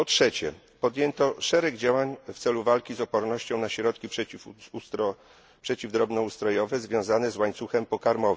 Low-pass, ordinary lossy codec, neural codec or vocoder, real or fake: none; none; none; real